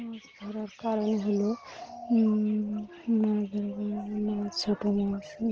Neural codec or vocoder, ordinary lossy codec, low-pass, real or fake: none; Opus, 16 kbps; 7.2 kHz; real